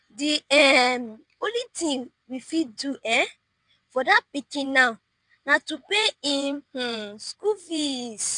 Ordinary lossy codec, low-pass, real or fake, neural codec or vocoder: none; 9.9 kHz; fake; vocoder, 22.05 kHz, 80 mel bands, WaveNeXt